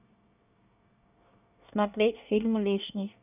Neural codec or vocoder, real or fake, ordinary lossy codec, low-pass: codec, 24 kHz, 1 kbps, SNAC; fake; none; 3.6 kHz